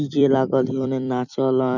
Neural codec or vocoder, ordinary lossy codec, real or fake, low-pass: vocoder, 44.1 kHz, 128 mel bands every 256 samples, BigVGAN v2; none; fake; 7.2 kHz